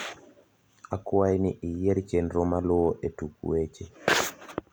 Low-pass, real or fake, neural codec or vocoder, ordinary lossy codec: none; real; none; none